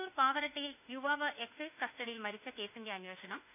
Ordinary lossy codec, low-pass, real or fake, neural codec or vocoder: none; 3.6 kHz; fake; autoencoder, 48 kHz, 32 numbers a frame, DAC-VAE, trained on Japanese speech